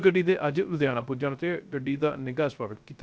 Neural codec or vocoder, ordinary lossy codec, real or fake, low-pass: codec, 16 kHz, 0.3 kbps, FocalCodec; none; fake; none